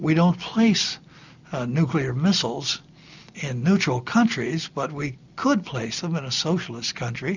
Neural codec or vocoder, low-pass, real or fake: none; 7.2 kHz; real